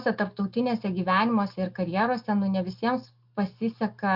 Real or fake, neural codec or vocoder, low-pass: real; none; 5.4 kHz